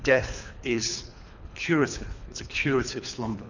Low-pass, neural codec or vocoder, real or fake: 7.2 kHz; codec, 24 kHz, 3 kbps, HILCodec; fake